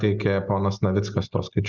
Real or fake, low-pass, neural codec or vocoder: real; 7.2 kHz; none